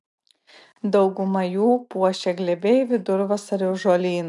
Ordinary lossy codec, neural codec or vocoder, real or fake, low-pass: MP3, 96 kbps; none; real; 10.8 kHz